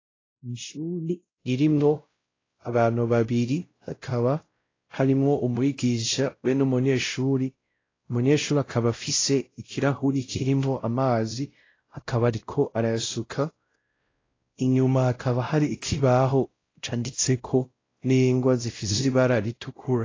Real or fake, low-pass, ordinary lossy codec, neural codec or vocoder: fake; 7.2 kHz; AAC, 32 kbps; codec, 16 kHz, 0.5 kbps, X-Codec, WavLM features, trained on Multilingual LibriSpeech